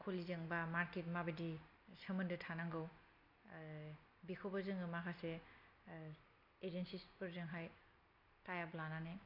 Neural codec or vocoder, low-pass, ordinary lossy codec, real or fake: none; 5.4 kHz; AAC, 48 kbps; real